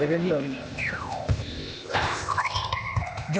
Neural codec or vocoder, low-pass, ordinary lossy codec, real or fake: codec, 16 kHz, 0.8 kbps, ZipCodec; none; none; fake